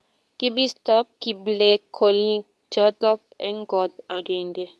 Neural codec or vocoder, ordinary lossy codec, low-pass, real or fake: codec, 24 kHz, 0.9 kbps, WavTokenizer, medium speech release version 2; none; none; fake